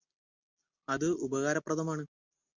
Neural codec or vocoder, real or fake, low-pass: none; real; 7.2 kHz